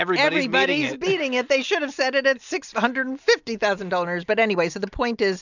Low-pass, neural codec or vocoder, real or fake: 7.2 kHz; none; real